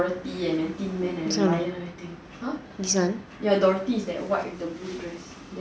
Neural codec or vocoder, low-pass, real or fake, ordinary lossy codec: none; none; real; none